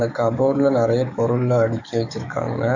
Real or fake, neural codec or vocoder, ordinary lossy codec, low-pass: fake; codec, 44.1 kHz, 7.8 kbps, DAC; none; 7.2 kHz